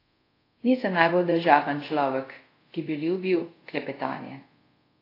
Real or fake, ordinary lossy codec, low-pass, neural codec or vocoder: fake; AAC, 32 kbps; 5.4 kHz; codec, 24 kHz, 0.5 kbps, DualCodec